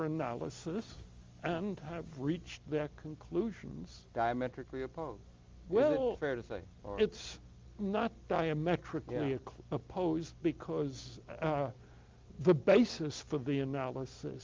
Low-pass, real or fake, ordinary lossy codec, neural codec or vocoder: 7.2 kHz; real; Opus, 32 kbps; none